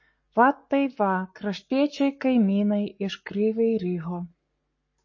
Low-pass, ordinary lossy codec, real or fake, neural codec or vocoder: 7.2 kHz; MP3, 32 kbps; fake; codec, 44.1 kHz, 7.8 kbps, Pupu-Codec